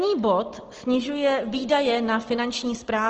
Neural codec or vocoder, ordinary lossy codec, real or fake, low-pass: none; Opus, 16 kbps; real; 7.2 kHz